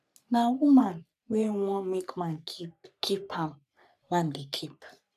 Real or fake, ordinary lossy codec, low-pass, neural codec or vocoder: fake; none; 14.4 kHz; codec, 44.1 kHz, 3.4 kbps, Pupu-Codec